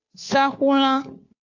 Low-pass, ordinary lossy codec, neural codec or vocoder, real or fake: 7.2 kHz; AAC, 48 kbps; codec, 16 kHz, 2 kbps, FunCodec, trained on Chinese and English, 25 frames a second; fake